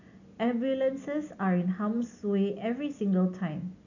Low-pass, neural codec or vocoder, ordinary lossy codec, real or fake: 7.2 kHz; none; none; real